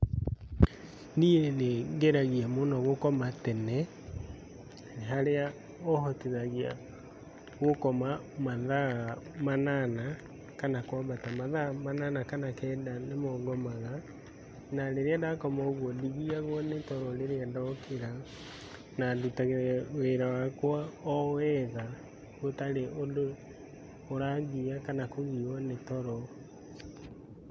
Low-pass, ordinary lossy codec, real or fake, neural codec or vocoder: none; none; real; none